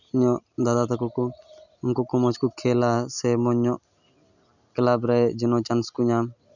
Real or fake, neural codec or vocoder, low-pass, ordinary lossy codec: real; none; 7.2 kHz; none